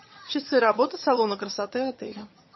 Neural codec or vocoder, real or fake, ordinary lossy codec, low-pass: vocoder, 22.05 kHz, 80 mel bands, HiFi-GAN; fake; MP3, 24 kbps; 7.2 kHz